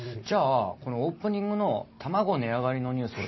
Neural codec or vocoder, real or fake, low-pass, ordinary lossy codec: none; real; 7.2 kHz; MP3, 24 kbps